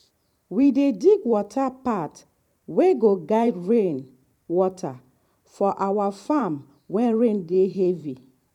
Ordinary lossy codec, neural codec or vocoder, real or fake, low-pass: none; vocoder, 44.1 kHz, 128 mel bands every 512 samples, BigVGAN v2; fake; 19.8 kHz